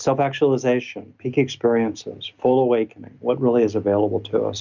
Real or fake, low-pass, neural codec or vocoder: real; 7.2 kHz; none